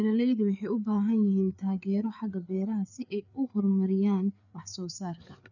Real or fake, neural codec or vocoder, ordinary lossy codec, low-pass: fake; codec, 16 kHz, 4 kbps, FreqCodec, larger model; none; 7.2 kHz